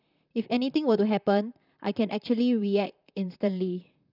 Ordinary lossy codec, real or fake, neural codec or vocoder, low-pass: none; fake; vocoder, 44.1 kHz, 128 mel bands, Pupu-Vocoder; 5.4 kHz